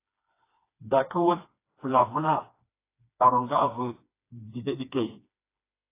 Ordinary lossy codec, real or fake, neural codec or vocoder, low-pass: AAC, 24 kbps; fake; codec, 16 kHz, 2 kbps, FreqCodec, smaller model; 3.6 kHz